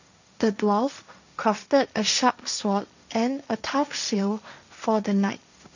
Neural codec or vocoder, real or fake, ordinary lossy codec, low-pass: codec, 16 kHz, 1.1 kbps, Voila-Tokenizer; fake; none; 7.2 kHz